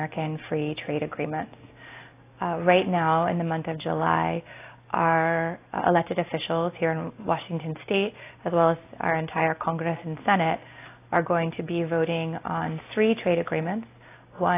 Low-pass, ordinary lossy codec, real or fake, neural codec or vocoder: 3.6 kHz; AAC, 24 kbps; real; none